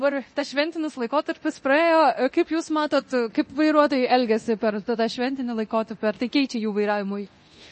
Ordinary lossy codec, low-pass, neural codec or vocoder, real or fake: MP3, 32 kbps; 10.8 kHz; codec, 24 kHz, 0.9 kbps, DualCodec; fake